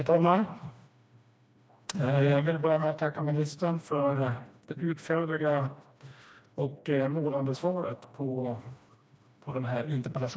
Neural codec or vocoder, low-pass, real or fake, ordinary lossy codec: codec, 16 kHz, 1 kbps, FreqCodec, smaller model; none; fake; none